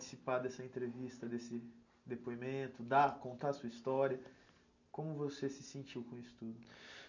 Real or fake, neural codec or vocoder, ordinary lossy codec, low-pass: real; none; none; 7.2 kHz